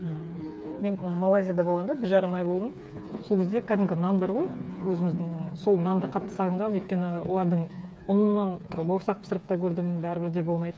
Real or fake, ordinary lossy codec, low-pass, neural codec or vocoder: fake; none; none; codec, 16 kHz, 4 kbps, FreqCodec, smaller model